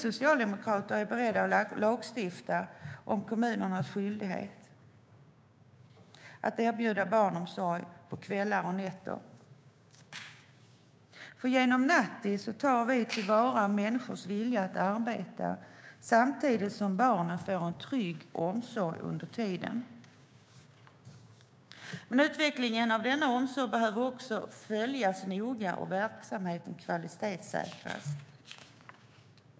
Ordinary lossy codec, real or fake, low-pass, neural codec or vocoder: none; fake; none; codec, 16 kHz, 6 kbps, DAC